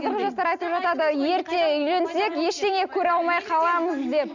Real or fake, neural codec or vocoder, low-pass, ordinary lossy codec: real; none; 7.2 kHz; none